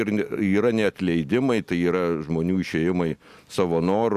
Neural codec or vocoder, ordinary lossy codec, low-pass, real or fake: none; MP3, 96 kbps; 14.4 kHz; real